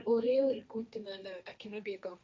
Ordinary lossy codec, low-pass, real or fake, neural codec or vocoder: none; none; fake; codec, 16 kHz, 1.1 kbps, Voila-Tokenizer